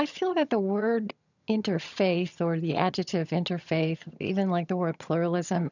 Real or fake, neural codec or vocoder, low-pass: fake; vocoder, 22.05 kHz, 80 mel bands, HiFi-GAN; 7.2 kHz